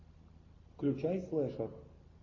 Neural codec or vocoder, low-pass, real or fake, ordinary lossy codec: none; 7.2 kHz; real; MP3, 48 kbps